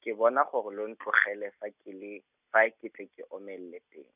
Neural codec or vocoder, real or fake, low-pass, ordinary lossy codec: none; real; 3.6 kHz; none